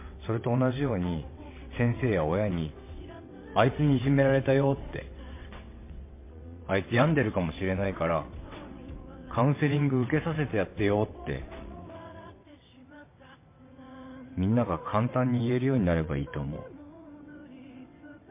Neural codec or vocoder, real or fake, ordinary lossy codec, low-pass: vocoder, 22.05 kHz, 80 mel bands, WaveNeXt; fake; MP3, 24 kbps; 3.6 kHz